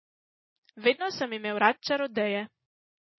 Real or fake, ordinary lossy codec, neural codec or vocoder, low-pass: real; MP3, 24 kbps; none; 7.2 kHz